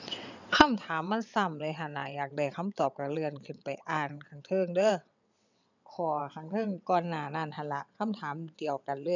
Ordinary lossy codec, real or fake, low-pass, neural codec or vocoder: none; fake; 7.2 kHz; vocoder, 22.05 kHz, 80 mel bands, WaveNeXt